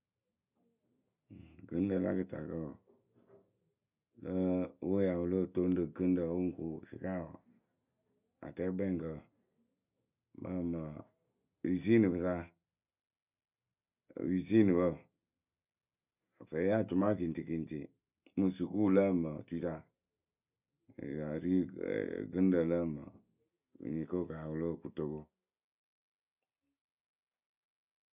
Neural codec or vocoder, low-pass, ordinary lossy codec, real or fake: none; 3.6 kHz; none; real